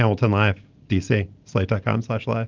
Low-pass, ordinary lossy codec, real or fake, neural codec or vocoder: 7.2 kHz; Opus, 24 kbps; real; none